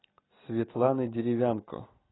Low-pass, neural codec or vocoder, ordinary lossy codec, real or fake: 7.2 kHz; none; AAC, 16 kbps; real